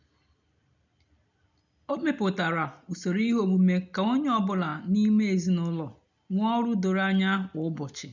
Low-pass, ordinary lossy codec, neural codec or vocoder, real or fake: 7.2 kHz; none; none; real